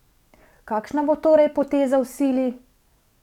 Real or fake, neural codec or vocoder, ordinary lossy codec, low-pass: fake; codec, 44.1 kHz, 7.8 kbps, DAC; none; 19.8 kHz